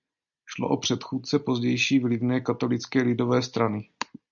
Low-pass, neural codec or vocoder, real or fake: 7.2 kHz; none; real